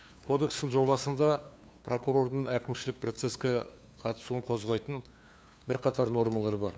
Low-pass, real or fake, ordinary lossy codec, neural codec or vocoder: none; fake; none; codec, 16 kHz, 2 kbps, FunCodec, trained on LibriTTS, 25 frames a second